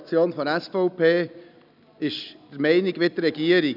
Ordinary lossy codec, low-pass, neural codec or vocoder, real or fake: none; 5.4 kHz; none; real